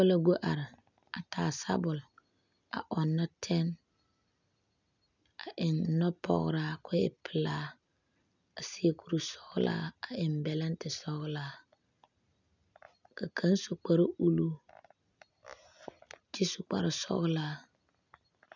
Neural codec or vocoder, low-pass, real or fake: none; 7.2 kHz; real